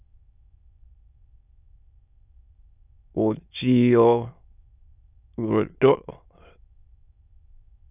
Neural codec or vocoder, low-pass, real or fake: autoencoder, 22.05 kHz, a latent of 192 numbers a frame, VITS, trained on many speakers; 3.6 kHz; fake